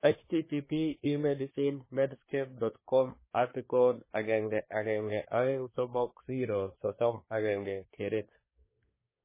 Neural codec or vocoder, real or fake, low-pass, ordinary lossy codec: codec, 24 kHz, 1 kbps, SNAC; fake; 3.6 kHz; MP3, 16 kbps